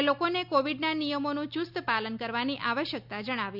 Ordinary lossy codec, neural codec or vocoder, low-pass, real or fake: none; none; 5.4 kHz; real